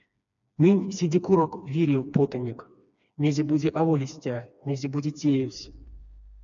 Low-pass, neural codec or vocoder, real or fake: 7.2 kHz; codec, 16 kHz, 2 kbps, FreqCodec, smaller model; fake